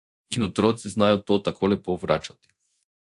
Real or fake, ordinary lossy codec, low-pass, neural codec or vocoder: fake; AAC, 64 kbps; 10.8 kHz; codec, 24 kHz, 0.9 kbps, DualCodec